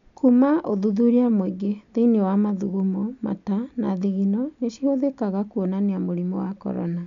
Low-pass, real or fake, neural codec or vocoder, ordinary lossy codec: 7.2 kHz; real; none; none